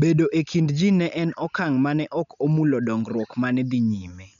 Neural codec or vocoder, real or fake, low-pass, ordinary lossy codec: none; real; 7.2 kHz; none